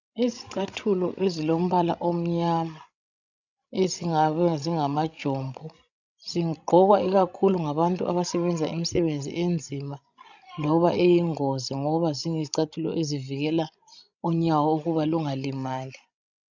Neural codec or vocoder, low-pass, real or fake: codec, 16 kHz, 16 kbps, FreqCodec, larger model; 7.2 kHz; fake